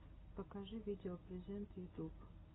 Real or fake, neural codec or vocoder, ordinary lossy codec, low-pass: real; none; AAC, 16 kbps; 7.2 kHz